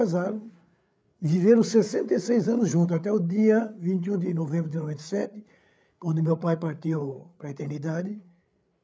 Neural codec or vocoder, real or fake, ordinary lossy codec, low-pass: codec, 16 kHz, 8 kbps, FreqCodec, larger model; fake; none; none